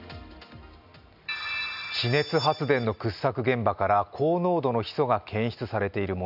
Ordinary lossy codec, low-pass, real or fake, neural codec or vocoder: none; 5.4 kHz; real; none